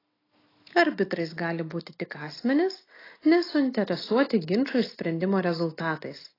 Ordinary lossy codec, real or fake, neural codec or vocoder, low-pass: AAC, 24 kbps; real; none; 5.4 kHz